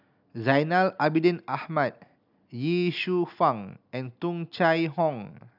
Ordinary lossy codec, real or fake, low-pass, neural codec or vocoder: none; real; 5.4 kHz; none